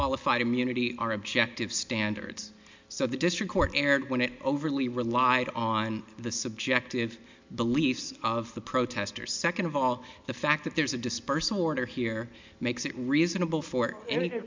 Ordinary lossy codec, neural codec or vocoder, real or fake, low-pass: MP3, 64 kbps; none; real; 7.2 kHz